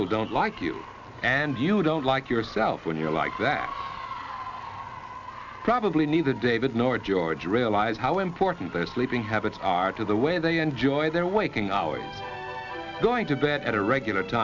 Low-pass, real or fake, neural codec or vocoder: 7.2 kHz; real; none